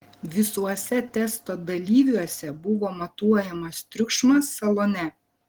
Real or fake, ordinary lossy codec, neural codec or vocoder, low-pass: real; Opus, 16 kbps; none; 19.8 kHz